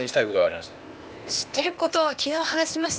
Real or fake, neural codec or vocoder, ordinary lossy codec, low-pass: fake; codec, 16 kHz, 0.8 kbps, ZipCodec; none; none